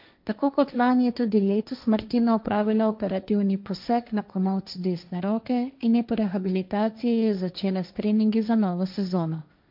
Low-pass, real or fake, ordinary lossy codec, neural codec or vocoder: 5.4 kHz; fake; MP3, 48 kbps; codec, 16 kHz, 1.1 kbps, Voila-Tokenizer